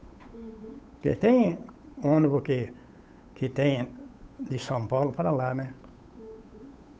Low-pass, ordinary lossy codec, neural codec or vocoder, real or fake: none; none; codec, 16 kHz, 8 kbps, FunCodec, trained on Chinese and English, 25 frames a second; fake